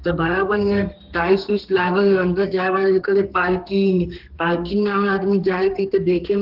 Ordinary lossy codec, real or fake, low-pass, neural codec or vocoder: Opus, 16 kbps; fake; 5.4 kHz; codec, 32 kHz, 1.9 kbps, SNAC